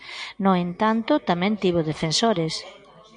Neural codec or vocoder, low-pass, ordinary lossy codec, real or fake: none; 9.9 kHz; MP3, 96 kbps; real